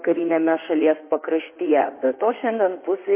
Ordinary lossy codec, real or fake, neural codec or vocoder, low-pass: MP3, 24 kbps; fake; codec, 16 kHz in and 24 kHz out, 2.2 kbps, FireRedTTS-2 codec; 3.6 kHz